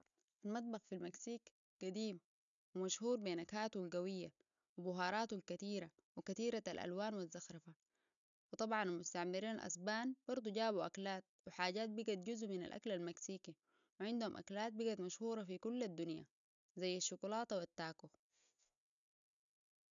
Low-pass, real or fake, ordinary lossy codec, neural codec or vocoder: 7.2 kHz; real; none; none